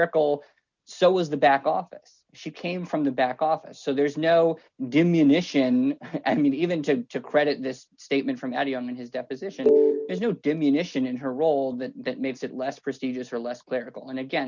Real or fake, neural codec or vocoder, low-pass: real; none; 7.2 kHz